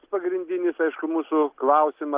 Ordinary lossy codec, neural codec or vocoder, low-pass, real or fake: Opus, 32 kbps; none; 3.6 kHz; real